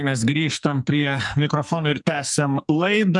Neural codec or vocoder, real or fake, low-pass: codec, 44.1 kHz, 2.6 kbps, SNAC; fake; 10.8 kHz